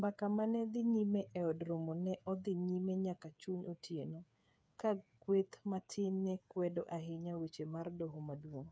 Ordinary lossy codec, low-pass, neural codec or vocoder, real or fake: none; none; codec, 16 kHz, 8 kbps, FreqCodec, smaller model; fake